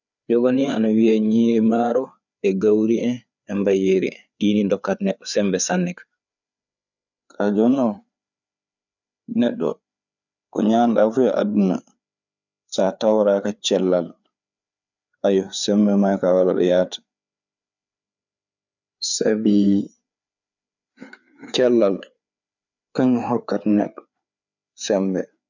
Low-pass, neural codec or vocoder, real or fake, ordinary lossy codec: 7.2 kHz; codec, 16 kHz, 4 kbps, FreqCodec, larger model; fake; none